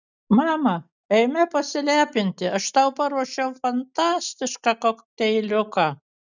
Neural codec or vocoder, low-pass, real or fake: none; 7.2 kHz; real